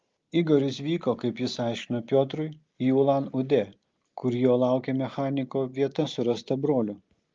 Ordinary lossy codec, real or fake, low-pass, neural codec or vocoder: Opus, 32 kbps; real; 7.2 kHz; none